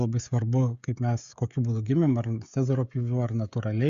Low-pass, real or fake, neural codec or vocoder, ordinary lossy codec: 7.2 kHz; fake; codec, 16 kHz, 16 kbps, FreqCodec, smaller model; Opus, 64 kbps